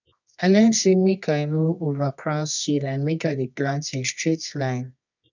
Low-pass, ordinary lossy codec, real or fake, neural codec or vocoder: 7.2 kHz; none; fake; codec, 24 kHz, 0.9 kbps, WavTokenizer, medium music audio release